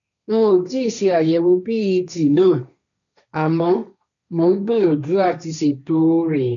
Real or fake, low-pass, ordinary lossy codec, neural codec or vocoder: fake; 7.2 kHz; none; codec, 16 kHz, 1.1 kbps, Voila-Tokenizer